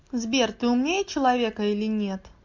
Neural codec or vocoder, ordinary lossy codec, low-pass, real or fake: none; MP3, 48 kbps; 7.2 kHz; real